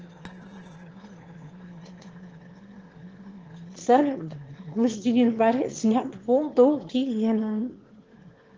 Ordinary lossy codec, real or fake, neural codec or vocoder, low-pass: Opus, 16 kbps; fake; autoencoder, 22.05 kHz, a latent of 192 numbers a frame, VITS, trained on one speaker; 7.2 kHz